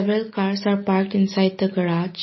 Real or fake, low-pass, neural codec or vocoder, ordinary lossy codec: real; 7.2 kHz; none; MP3, 24 kbps